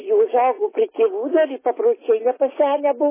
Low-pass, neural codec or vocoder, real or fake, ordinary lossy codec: 3.6 kHz; none; real; MP3, 16 kbps